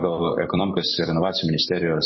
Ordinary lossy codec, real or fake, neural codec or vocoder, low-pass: MP3, 24 kbps; fake; vocoder, 44.1 kHz, 128 mel bands every 256 samples, BigVGAN v2; 7.2 kHz